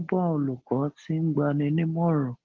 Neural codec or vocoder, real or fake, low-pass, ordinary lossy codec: none; real; 7.2 kHz; Opus, 16 kbps